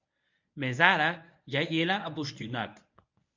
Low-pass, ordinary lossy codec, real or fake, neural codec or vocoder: 7.2 kHz; MP3, 64 kbps; fake; codec, 24 kHz, 0.9 kbps, WavTokenizer, medium speech release version 1